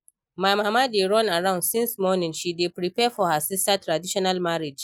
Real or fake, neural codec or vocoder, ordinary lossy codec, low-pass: real; none; none; none